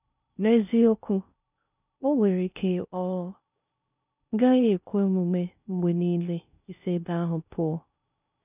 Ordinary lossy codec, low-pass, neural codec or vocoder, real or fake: none; 3.6 kHz; codec, 16 kHz in and 24 kHz out, 0.6 kbps, FocalCodec, streaming, 4096 codes; fake